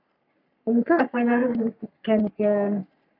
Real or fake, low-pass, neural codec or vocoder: fake; 5.4 kHz; codec, 44.1 kHz, 3.4 kbps, Pupu-Codec